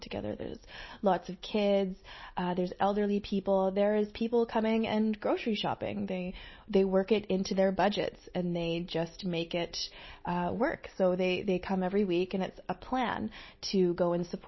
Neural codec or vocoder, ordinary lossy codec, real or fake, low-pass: none; MP3, 24 kbps; real; 7.2 kHz